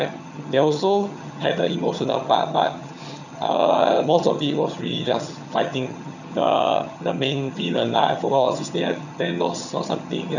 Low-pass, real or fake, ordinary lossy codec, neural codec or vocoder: 7.2 kHz; fake; none; vocoder, 22.05 kHz, 80 mel bands, HiFi-GAN